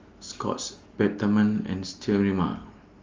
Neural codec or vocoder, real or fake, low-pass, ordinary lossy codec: none; real; 7.2 kHz; Opus, 32 kbps